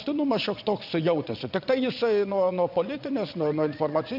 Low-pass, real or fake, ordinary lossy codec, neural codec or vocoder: 5.4 kHz; fake; AAC, 48 kbps; vocoder, 22.05 kHz, 80 mel bands, Vocos